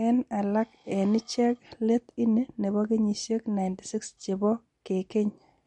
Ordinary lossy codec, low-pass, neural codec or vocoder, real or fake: MP3, 48 kbps; 10.8 kHz; none; real